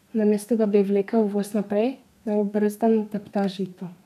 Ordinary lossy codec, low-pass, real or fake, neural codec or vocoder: none; 14.4 kHz; fake; codec, 32 kHz, 1.9 kbps, SNAC